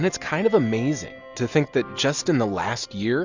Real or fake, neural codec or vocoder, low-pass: real; none; 7.2 kHz